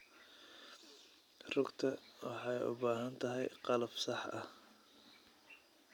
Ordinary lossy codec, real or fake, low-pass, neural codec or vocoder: none; real; 19.8 kHz; none